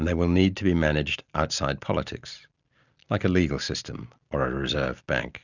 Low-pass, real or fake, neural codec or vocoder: 7.2 kHz; real; none